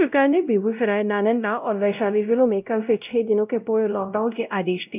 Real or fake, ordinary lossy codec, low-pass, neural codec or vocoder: fake; none; 3.6 kHz; codec, 16 kHz, 0.5 kbps, X-Codec, WavLM features, trained on Multilingual LibriSpeech